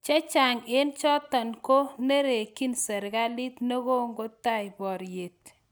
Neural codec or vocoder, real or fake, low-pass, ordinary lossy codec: none; real; none; none